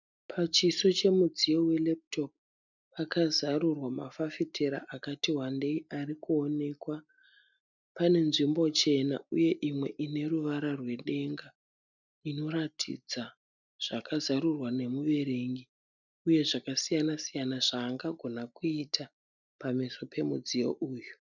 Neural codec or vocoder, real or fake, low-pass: none; real; 7.2 kHz